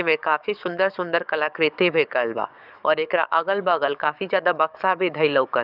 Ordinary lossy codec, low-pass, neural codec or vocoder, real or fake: none; 5.4 kHz; codec, 44.1 kHz, 7.8 kbps, DAC; fake